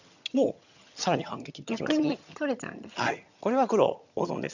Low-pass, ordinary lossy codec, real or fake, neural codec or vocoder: 7.2 kHz; none; fake; vocoder, 22.05 kHz, 80 mel bands, HiFi-GAN